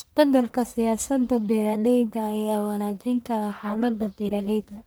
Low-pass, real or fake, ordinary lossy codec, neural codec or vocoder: none; fake; none; codec, 44.1 kHz, 1.7 kbps, Pupu-Codec